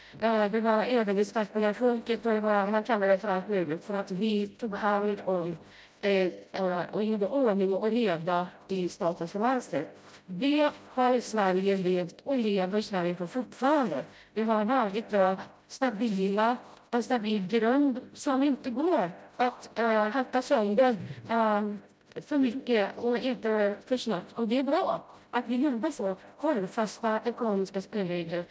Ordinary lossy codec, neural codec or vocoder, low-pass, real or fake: none; codec, 16 kHz, 0.5 kbps, FreqCodec, smaller model; none; fake